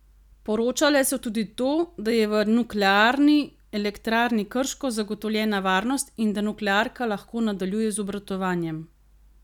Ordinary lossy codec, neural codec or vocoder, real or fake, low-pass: none; none; real; 19.8 kHz